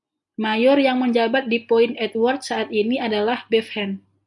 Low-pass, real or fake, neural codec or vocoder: 10.8 kHz; real; none